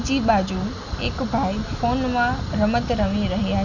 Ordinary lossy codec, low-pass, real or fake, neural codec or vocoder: none; 7.2 kHz; real; none